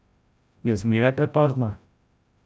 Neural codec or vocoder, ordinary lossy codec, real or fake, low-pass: codec, 16 kHz, 0.5 kbps, FreqCodec, larger model; none; fake; none